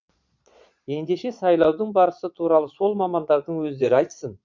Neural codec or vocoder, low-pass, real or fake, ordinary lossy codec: vocoder, 22.05 kHz, 80 mel bands, Vocos; 7.2 kHz; fake; MP3, 64 kbps